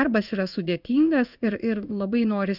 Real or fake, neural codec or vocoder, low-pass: fake; codec, 16 kHz in and 24 kHz out, 1 kbps, XY-Tokenizer; 5.4 kHz